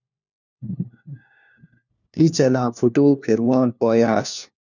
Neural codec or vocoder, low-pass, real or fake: codec, 16 kHz, 1 kbps, FunCodec, trained on LibriTTS, 50 frames a second; 7.2 kHz; fake